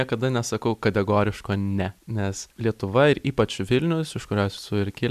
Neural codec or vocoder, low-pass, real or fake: none; 14.4 kHz; real